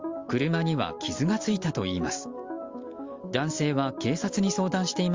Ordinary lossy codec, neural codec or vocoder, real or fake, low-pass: Opus, 32 kbps; none; real; 7.2 kHz